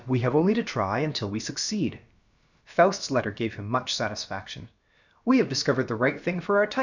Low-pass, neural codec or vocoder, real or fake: 7.2 kHz; codec, 16 kHz, about 1 kbps, DyCAST, with the encoder's durations; fake